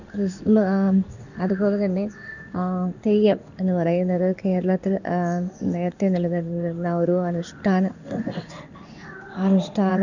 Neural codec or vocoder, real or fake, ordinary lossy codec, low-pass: codec, 16 kHz in and 24 kHz out, 1 kbps, XY-Tokenizer; fake; none; 7.2 kHz